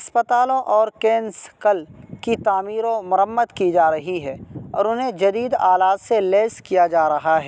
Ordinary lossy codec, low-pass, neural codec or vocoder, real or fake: none; none; none; real